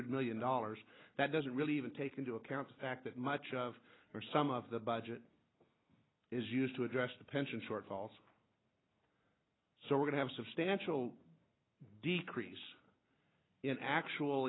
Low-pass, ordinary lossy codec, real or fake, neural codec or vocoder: 7.2 kHz; AAC, 16 kbps; real; none